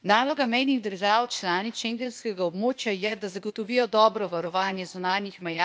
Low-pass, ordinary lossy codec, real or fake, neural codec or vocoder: none; none; fake; codec, 16 kHz, 0.8 kbps, ZipCodec